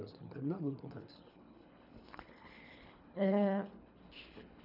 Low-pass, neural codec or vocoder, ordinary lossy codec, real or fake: 5.4 kHz; codec, 24 kHz, 3 kbps, HILCodec; none; fake